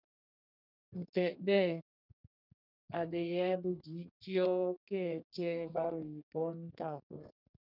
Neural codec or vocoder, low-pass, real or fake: codec, 32 kHz, 1.9 kbps, SNAC; 5.4 kHz; fake